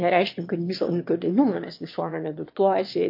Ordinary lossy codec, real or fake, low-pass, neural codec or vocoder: MP3, 32 kbps; fake; 5.4 kHz; autoencoder, 22.05 kHz, a latent of 192 numbers a frame, VITS, trained on one speaker